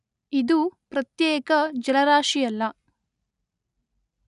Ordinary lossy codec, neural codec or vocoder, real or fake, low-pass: none; none; real; 10.8 kHz